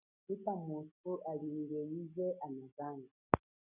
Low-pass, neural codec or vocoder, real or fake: 3.6 kHz; none; real